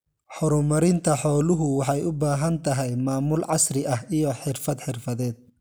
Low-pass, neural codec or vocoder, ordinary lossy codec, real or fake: none; none; none; real